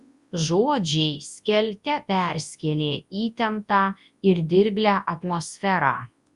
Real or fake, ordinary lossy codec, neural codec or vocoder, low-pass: fake; Opus, 64 kbps; codec, 24 kHz, 0.9 kbps, WavTokenizer, large speech release; 10.8 kHz